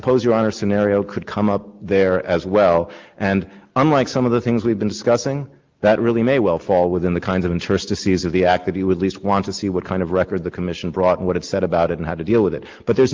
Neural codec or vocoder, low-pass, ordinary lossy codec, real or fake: none; 7.2 kHz; Opus, 32 kbps; real